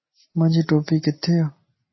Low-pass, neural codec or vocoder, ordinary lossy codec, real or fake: 7.2 kHz; none; MP3, 24 kbps; real